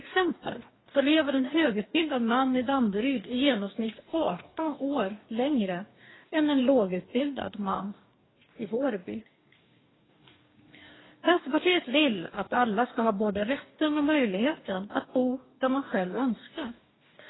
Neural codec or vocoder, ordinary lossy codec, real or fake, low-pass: codec, 44.1 kHz, 2.6 kbps, DAC; AAC, 16 kbps; fake; 7.2 kHz